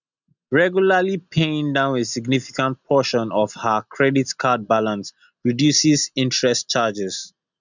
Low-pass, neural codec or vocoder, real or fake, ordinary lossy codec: 7.2 kHz; none; real; none